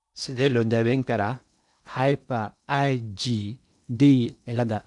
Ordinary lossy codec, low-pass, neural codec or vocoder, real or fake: none; 10.8 kHz; codec, 16 kHz in and 24 kHz out, 0.8 kbps, FocalCodec, streaming, 65536 codes; fake